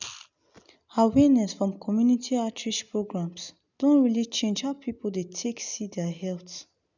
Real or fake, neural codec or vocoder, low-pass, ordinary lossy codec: real; none; 7.2 kHz; none